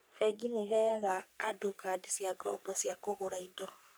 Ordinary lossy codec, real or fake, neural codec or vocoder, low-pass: none; fake; codec, 44.1 kHz, 3.4 kbps, Pupu-Codec; none